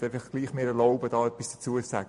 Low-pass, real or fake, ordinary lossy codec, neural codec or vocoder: 14.4 kHz; real; MP3, 48 kbps; none